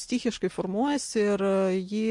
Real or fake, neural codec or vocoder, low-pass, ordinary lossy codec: real; none; 10.8 kHz; MP3, 48 kbps